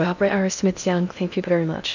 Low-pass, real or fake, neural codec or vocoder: 7.2 kHz; fake; codec, 16 kHz in and 24 kHz out, 0.6 kbps, FocalCodec, streaming, 2048 codes